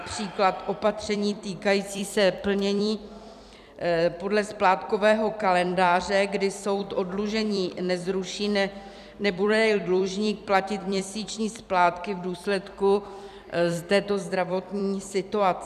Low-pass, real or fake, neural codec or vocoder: 14.4 kHz; fake; vocoder, 44.1 kHz, 128 mel bands every 256 samples, BigVGAN v2